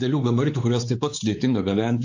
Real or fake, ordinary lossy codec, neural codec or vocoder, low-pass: fake; AAC, 48 kbps; codec, 16 kHz, 4 kbps, X-Codec, WavLM features, trained on Multilingual LibriSpeech; 7.2 kHz